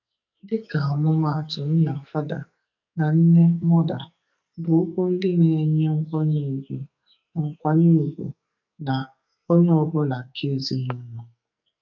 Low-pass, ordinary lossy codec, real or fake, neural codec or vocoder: 7.2 kHz; none; fake; codec, 44.1 kHz, 2.6 kbps, SNAC